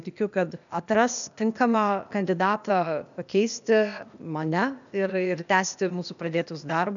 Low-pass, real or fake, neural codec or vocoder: 7.2 kHz; fake; codec, 16 kHz, 0.8 kbps, ZipCodec